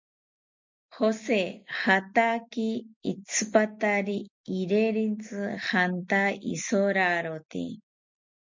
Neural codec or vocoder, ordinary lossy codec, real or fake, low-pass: none; MP3, 64 kbps; real; 7.2 kHz